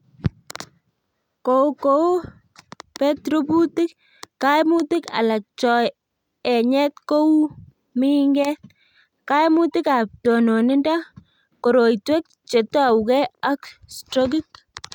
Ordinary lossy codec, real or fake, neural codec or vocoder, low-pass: none; real; none; 19.8 kHz